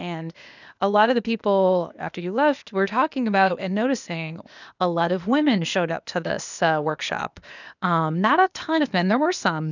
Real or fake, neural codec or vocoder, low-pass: fake; codec, 16 kHz, 0.8 kbps, ZipCodec; 7.2 kHz